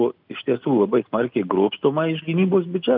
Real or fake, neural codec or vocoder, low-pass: real; none; 5.4 kHz